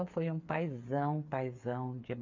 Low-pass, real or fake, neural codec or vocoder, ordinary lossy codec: 7.2 kHz; fake; codec, 16 kHz, 8 kbps, FreqCodec, smaller model; MP3, 48 kbps